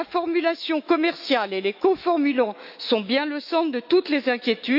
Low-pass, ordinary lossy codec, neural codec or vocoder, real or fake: 5.4 kHz; none; autoencoder, 48 kHz, 128 numbers a frame, DAC-VAE, trained on Japanese speech; fake